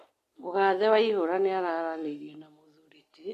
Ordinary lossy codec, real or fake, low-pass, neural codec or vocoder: AAC, 48 kbps; real; 14.4 kHz; none